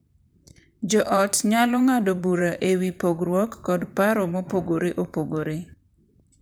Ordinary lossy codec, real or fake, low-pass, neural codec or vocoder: none; fake; none; vocoder, 44.1 kHz, 128 mel bands, Pupu-Vocoder